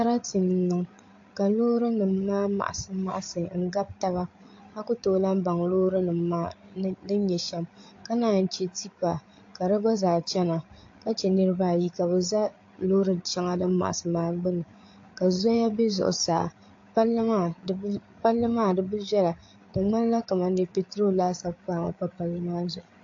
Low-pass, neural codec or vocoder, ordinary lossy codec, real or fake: 7.2 kHz; codec, 16 kHz, 8 kbps, FreqCodec, larger model; AAC, 48 kbps; fake